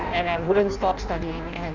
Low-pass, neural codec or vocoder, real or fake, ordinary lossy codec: 7.2 kHz; codec, 16 kHz in and 24 kHz out, 0.6 kbps, FireRedTTS-2 codec; fake; none